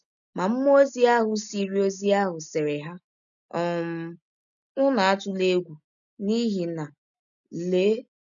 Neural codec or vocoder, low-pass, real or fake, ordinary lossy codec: none; 7.2 kHz; real; none